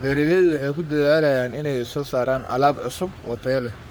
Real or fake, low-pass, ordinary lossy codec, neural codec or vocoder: fake; none; none; codec, 44.1 kHz, 3.4 kbps, Pupu-Codec